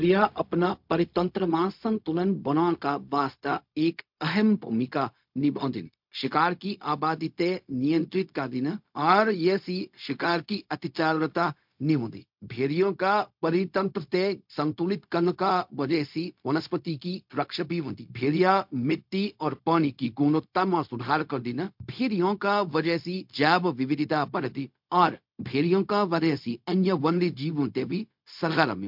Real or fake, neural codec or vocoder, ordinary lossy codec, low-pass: fake; codec, 16 kHz, 0.4 kbps, LongCat-Audio-Codec; MP3, 48 kbps; 5.4 kHz